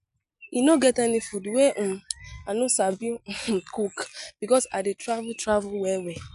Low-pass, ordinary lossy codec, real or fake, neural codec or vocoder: 10.8 kHz; none; real; none